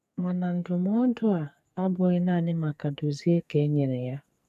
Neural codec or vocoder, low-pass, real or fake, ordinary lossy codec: codec, 44.1 kHz, 2.6 kbps, SNAC; 14.4 kHz; fake; none